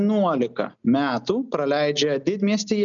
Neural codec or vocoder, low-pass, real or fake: none; 7.2 kHz; real